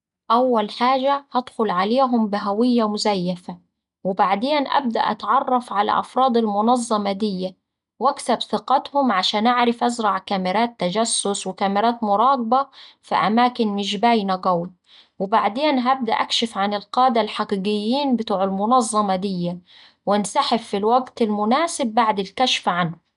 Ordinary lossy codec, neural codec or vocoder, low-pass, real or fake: none; none; 10.8 kHz; real